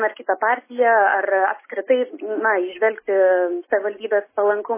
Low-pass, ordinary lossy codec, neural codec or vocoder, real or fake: 3.6 kHz; MP3, 16 kbps; none; real